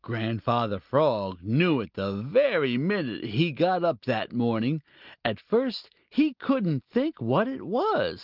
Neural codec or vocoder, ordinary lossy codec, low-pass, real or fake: none; Opus, 32 kbps; 5.4 kHz; real